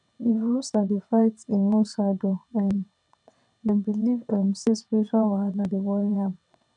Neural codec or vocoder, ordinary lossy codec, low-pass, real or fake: vocoder, 22.05 kHz, 80 mel bands, WaveNeXt; none; 9.9 kHz; fake